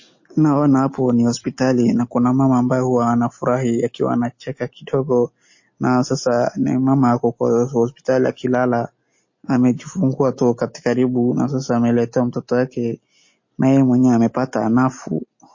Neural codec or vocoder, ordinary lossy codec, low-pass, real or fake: none; MP3, 32 kbps; 7.2 kHz; real